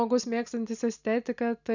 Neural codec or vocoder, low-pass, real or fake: none; 7.2 kHz; real